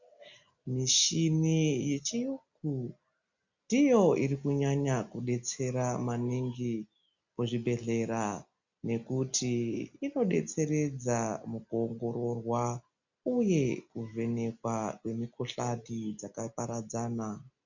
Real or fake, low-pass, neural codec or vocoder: real; 7.2 kHz; none